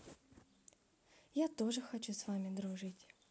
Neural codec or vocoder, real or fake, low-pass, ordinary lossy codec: none; real; none; none